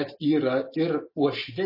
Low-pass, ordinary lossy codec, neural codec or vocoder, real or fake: 5.4 kHz; MP3, 24 kbps; none; real